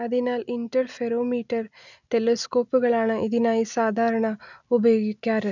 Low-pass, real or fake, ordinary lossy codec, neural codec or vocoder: 7.2 kHz; real; none; none